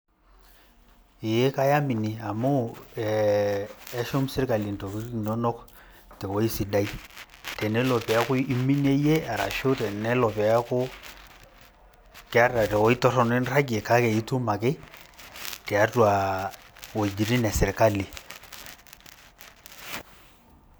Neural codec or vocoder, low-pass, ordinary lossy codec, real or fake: none; none; none; real